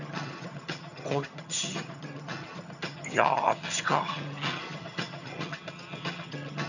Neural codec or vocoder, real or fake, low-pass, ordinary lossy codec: vocoder, 22.05 kHz, 80 mel bands, HiFi-GAN; fake; 7.2 kHz; none